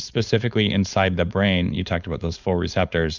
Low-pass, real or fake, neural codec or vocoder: 7.2 kHz; fake; codec, 16 kHz, 4.8 kbps, FACodec